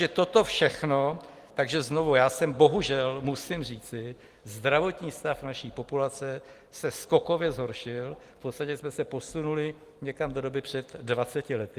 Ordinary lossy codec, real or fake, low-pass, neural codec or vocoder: Opus, 24 kbps; real; 14.4 kHz; none